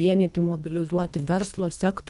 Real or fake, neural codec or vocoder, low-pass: fake; codec, 24 kHz, 1.5 kbps, HILCodec; 10.8 kHz